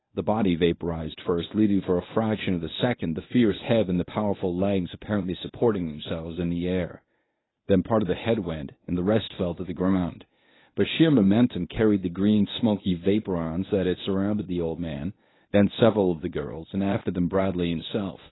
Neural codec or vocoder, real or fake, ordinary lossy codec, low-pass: codec, 24 kHz, 0.9 kbps, WavTokenizer, medium speech release version 1; fake; AAC, 16 kbps; 7.2 kHz